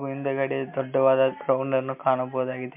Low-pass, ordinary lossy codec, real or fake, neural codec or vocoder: 3.6 kHz; MP3, 32 kbps; real; none